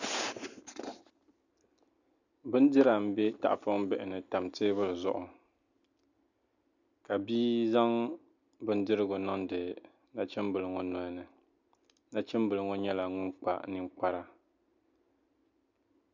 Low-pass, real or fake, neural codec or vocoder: 7.2 kHz; real; none